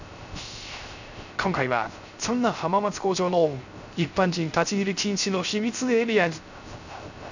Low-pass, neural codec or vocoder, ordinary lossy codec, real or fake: 7.2 kHz; codec, 16 kHz, 0.3 kbps, FocalCodec; none; fake